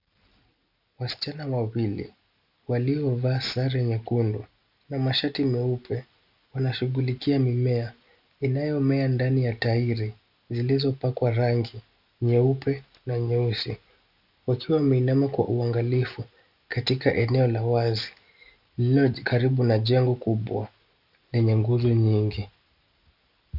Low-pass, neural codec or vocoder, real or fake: 5.4 kHz; none; real